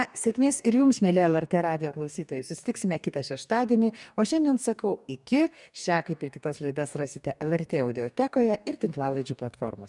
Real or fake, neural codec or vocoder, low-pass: fake; codec, 44.1 kHz, 2.6 kbps, DAC; 10.8 kHz